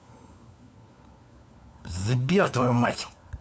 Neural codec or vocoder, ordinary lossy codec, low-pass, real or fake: codec, 16 kHz, 4 kbps, FunCodec, trained on LibriTTS, 50 frames a second; none; none; fake